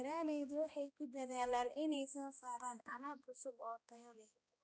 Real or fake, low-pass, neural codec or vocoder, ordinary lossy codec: fake; none; codec, 16 kHz, 1 kbps, X-Codec, HuBERT features, trained on balanced general audio; none